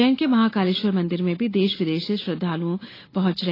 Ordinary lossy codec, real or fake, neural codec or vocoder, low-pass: AAC, 24 kbps; real; none; 5.4 kHz